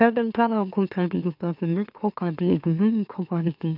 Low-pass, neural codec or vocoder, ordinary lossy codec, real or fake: 5.4 kHz; autoencoder, 44.1 kHz, a latent of 192 numbers a frame, MeloTTS; none; fake